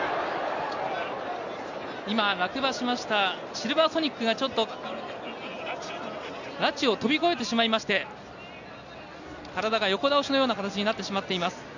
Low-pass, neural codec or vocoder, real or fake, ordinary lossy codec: 7.2 kHz; none; real; none